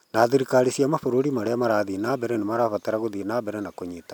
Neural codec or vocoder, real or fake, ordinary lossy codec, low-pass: none; real; none; 19.8 kHz